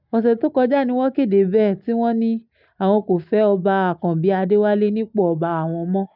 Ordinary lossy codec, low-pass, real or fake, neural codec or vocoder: none; 5.4 kHz; real; none